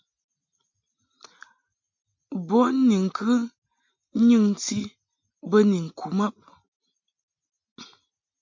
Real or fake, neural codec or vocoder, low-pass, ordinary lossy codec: real; none; 7.2 kHz; MP3, 48 kbps